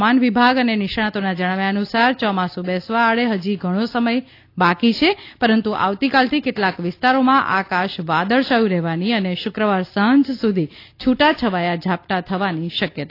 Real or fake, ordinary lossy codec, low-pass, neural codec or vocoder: real; AAC, 32 kbps; 5.4 kHz; none